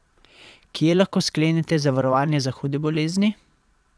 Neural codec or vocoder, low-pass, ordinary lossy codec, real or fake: vocoder, 22.05 kHz, 80 mel bands, Vocos; none; none; fake